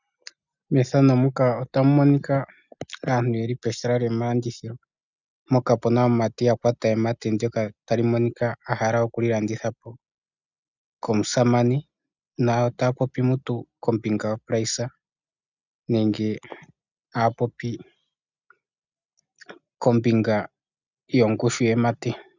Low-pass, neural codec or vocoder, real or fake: 7.2 kHz; none; real